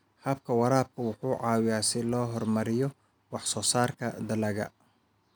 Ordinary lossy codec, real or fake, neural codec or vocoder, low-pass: none; real; none; none